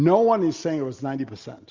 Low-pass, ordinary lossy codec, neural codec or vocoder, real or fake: 7.2 kHz; Opus, 64 kbps; none; real